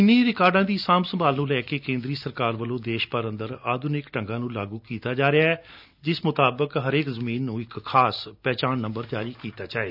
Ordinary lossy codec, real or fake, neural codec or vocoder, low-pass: none; real; none; 5.4 kHz